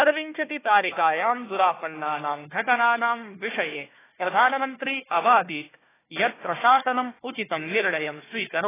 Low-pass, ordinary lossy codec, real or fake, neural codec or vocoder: 3.6 kHz; AAC, 16 kbps; fake; codec, 16 kHz, 1 kbps, FunCodec, trained on Chinese and English, 50 frames a second